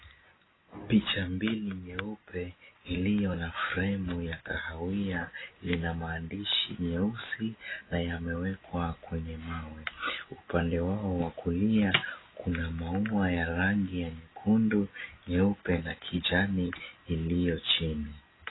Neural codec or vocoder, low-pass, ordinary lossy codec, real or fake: none; 7.2 kHz; AAC, 16 kbps; real